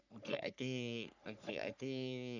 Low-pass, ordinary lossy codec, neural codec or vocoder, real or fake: 7.2 kHz; none; codec, 44.1 kHz, 3.4 kbps, Pupu-Codec; fake